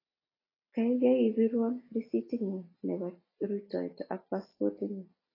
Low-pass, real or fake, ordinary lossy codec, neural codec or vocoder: 5.4 kHz; real; AAC, 24 kbps; none